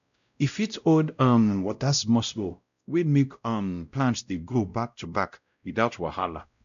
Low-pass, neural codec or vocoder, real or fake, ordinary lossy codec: 7.2 kHz; codec, 16 kHz, 0.5 kbps, X-Codec, WavLM features, trained on Multilingual LibriSpeech; fake; AAC, 96 kbps